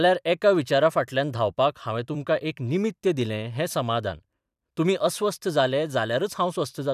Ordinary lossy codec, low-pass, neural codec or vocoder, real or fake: none; 14.4 kHz; vocoder, 44.1 kHz, 128 mel bands every 256 samples, BigVGAN v2; fake